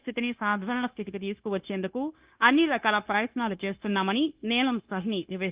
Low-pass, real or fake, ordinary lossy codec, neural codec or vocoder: 3.6 kHz; fake; Opus, 32 kbps; codec, 16 kHz, 0.9 kbps, LongCat-Audio-Codec